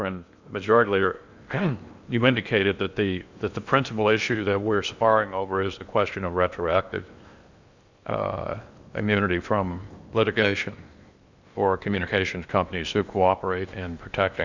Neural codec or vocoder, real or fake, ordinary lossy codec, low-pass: codec, 16 kHz in and 24 kHz out, 0.8 kbps, FocalCodec, streaming, 65536 codes; fake; Opus, 64 kbps; 7.2 kHz